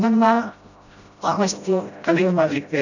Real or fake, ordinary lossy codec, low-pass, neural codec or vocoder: fake; none; 7.2 kHz; codec, 16 kHz, 0.5 kbps, FreqCodec, smaller model